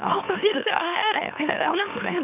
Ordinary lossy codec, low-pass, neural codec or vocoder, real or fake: none; 3.6 kHz; autoencoder, 44.1 kHz, a latent of 192 numbers a frame, MeloTTS; fake